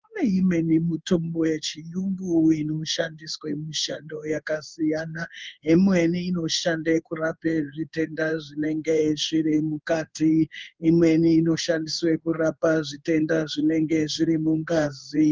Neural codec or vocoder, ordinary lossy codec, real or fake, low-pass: codec, 16 kHz in and 24 kHz out, 1 kbps, XY-Tokenizer; Opus, 32 kbps; fake; 7.2 kHz